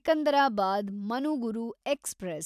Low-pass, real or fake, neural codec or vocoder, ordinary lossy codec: 14.4 kHz; real; none; none